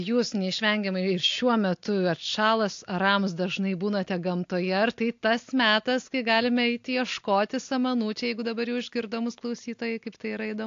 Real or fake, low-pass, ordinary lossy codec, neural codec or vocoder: real; 7.2 kHz; AAC, 48 kbps; none